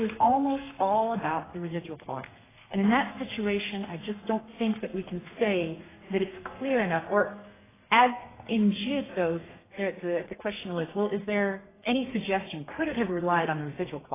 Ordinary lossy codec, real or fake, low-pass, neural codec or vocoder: AAC, 16 kbps; fake; 3.6 kHz; codec, 32 kHz, 1.9 kbps, SNAC